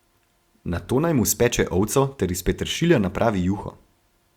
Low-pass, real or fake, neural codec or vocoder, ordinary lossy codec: 19.8 kHz; real; none; Opus, 64 kbps